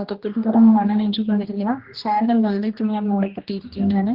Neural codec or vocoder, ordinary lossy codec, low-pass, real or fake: codec, 16 kHz, 1 kbps, X-Codec, HuBERT features, trained on general audio; Opus, 16 kbps; 5.4 kHz; fake